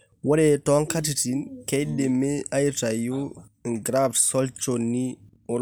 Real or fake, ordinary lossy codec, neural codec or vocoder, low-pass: real; none; none; none